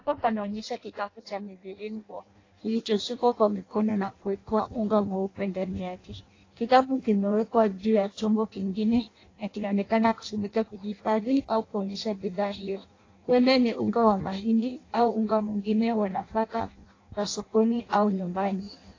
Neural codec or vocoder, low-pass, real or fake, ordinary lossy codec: codec, 16 kHz in and 24 kHz out, 0.6 kbps, FireRedTTS-2 codec; 7.2 kHz; fake; AAC, 32 kbps